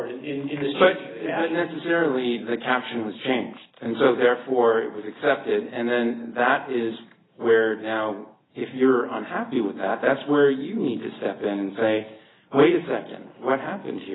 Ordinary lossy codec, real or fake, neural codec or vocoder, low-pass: AAC, 16 kbps; real; none; 7.2 kHz